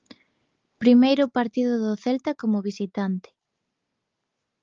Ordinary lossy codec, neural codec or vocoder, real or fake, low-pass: Opus, 32 kbps; none; real; 7.2 kHz